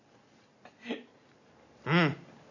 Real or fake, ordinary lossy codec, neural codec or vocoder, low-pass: real; none; none; 7.2 kHz